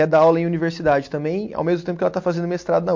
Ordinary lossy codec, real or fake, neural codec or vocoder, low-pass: MP3, 48 kbps; real; none; 7.2 kHz